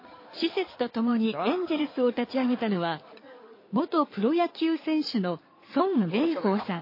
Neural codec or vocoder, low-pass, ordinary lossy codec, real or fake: codec, 16 kHz in and 24 kHz out, 2.2 kbps, FireRedTTS-2 codec; 5.4 kHz; MP3, 24 kbps; fake